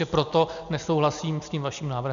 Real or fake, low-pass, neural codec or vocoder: real; 7.2 kHz; none